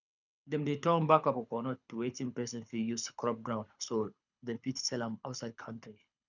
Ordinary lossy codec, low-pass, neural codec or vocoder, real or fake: none; 7.2 kHz; codec, 24 kHz, 6 kbps, HILCodec; fake